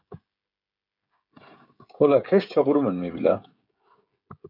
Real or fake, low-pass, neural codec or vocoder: fake; 5.4 kHz; codec, 16 kHz, 16 kbps, FreqCodec, smaller model